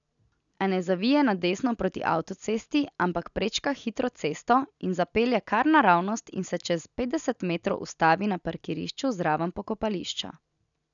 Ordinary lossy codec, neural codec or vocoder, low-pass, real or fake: none; none; 7.2 kHz; real